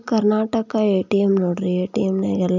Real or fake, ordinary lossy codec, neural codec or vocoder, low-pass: real; none; none; 7.2 kHz